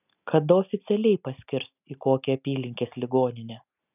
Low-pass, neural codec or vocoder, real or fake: 3.6 kHz; none; real